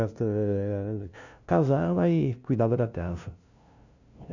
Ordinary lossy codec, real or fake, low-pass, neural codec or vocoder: none; fake; 7.2 kHz; codec, 16 kHz, 0.5 kbps, FunCodec, trained on LibriTTS, 25 frames a second